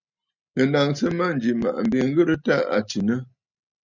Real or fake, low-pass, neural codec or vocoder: real; 7.2 kHz; none